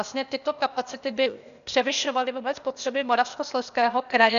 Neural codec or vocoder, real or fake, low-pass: codec, 16 kHz, 0.8 kbps, ZipCodec; fake; 7.2 kHz